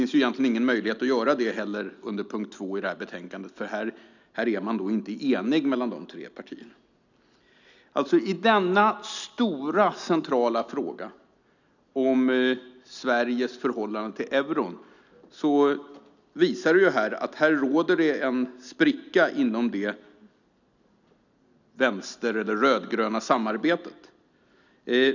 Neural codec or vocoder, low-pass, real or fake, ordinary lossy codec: none; 7.2 kHz; real; none